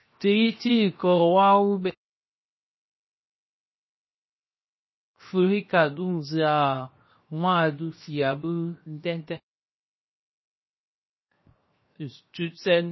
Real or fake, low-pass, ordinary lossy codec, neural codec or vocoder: fake; 7.2 kHz; MP3, 24 kbps; codec, 16 kHz, 0.7 kbps, FocalCodec